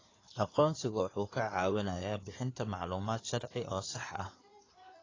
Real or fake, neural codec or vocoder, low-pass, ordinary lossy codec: fake; codec, 16 kHz in and 24 kHz out, 2.2 kbps, FireRedTTS-2 codec; 7.2 kHz; AAC, 32 kbps